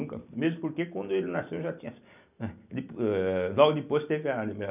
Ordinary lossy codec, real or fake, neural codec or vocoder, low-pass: none; real; none; 3.6 kHz